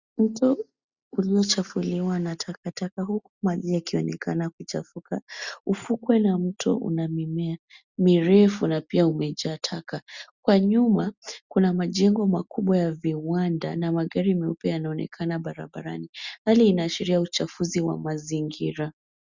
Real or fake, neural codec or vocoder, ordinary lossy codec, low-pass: real; none; Opus, 64 kbps; 7.2 kHz